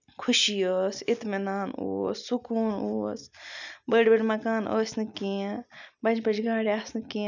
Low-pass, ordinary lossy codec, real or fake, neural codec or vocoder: 7.2 kHz; none; real; none